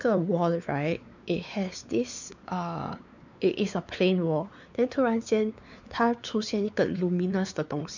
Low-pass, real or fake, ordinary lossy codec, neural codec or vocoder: 7.2 kHz; fake; none; codec, 16 kHz, 4 kbps, X-Codec, WavLM features, trained on Multilingual LibriSpeech